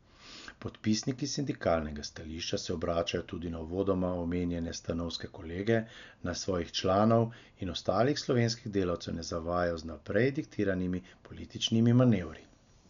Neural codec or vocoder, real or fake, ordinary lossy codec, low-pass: none; real; none; 7.2 kHz